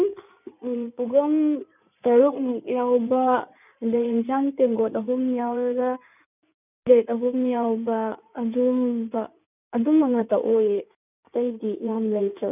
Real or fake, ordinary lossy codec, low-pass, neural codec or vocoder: fake; none; 3.6 kHz; codec, 16 kHz in and 24 kHz out, 2.2 kbps, FireRedTTS-2 codec